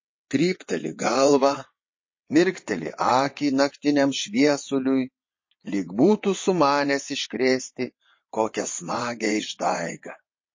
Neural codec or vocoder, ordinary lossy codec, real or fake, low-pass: vocoder, 22.05 kHz, 80 mel bands, WaveNeXt; MP3, 32 kbps; fake; 7.2 kHz